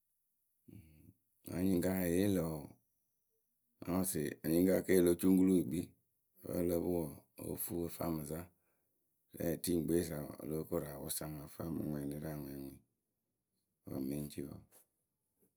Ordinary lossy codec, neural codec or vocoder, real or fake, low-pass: none; none; real; none